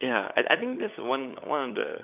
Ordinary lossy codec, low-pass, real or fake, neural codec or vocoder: none; 3.6 kHz; fake; codec, 16 kHz, 16 kbps, FunCodec, trained on LibriTTS, 50 frames a second